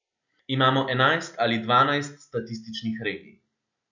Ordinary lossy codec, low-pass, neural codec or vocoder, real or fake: none; 7.2 kHz; none; real